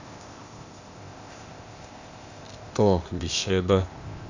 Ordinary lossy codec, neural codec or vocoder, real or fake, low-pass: Opus, 64 kbps; codec, 16 kHz, 0.8 kbps, ZipCodec; fake; 7.2 kHz